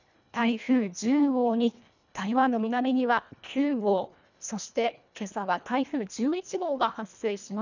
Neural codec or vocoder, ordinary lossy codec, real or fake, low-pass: codec, 24 kHz, 1.5 kbps, HILCodec; none; fake; 7.2 kHz